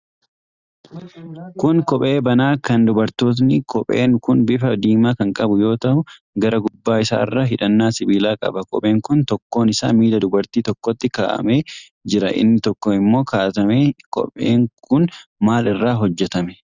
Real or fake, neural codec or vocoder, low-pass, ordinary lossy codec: real; none; 7.2 kHz; Opus, 64 kbps